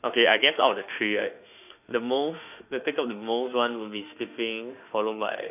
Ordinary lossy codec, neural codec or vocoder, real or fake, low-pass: none; autoencoder, 48 kHz, 32 numbers a frame, DAC-VAE, trained on Japanese speech; fake; 3.6 kHz